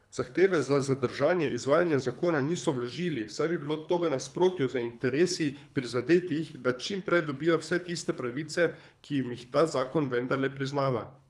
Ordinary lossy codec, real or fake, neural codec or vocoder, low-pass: none; fake; codec, 24 kHz, 3 kbps, HILCodec; none